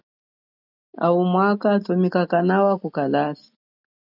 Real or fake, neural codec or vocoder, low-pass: real; none; 5.4 kHz